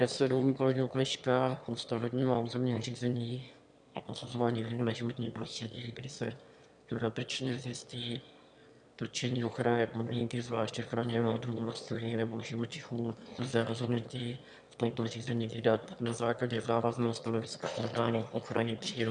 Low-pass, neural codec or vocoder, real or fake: 9.9 kHz; autoencoder, 22.05 kHz, a latent of 192 numbers a frame, VITS, trained on one speaker; fake